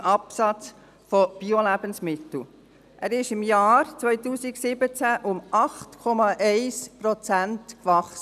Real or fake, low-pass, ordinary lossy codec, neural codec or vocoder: real; 14.4 kHz; none; none